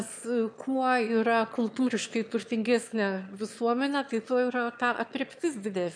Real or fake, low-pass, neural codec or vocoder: fake; 9.9 kHz; autoencoder, 22.05 kHz, a latent of 192 numbers a frame, VITS, trained on one speaker